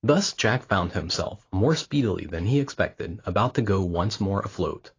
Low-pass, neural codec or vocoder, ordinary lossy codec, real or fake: 7.2 kHz; none; AAC, 32 kbps; real